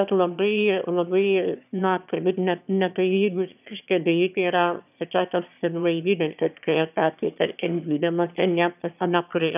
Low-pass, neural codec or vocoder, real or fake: 3.6 kHz; autoencoder, 22.05 kHz, a latent of 192 numbers a frame, VITS, trained on one speaker; fake